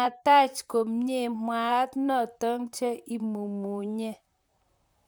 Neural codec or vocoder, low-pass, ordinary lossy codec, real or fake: vocoder, 44.1 kHz, 128 mel bands, Pupu-Vocoder; none; none; fake